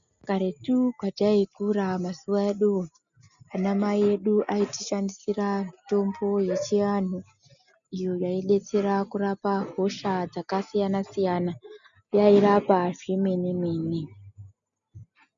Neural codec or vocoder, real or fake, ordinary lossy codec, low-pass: none; real; MP3, 96 kbps; 7.2 kHz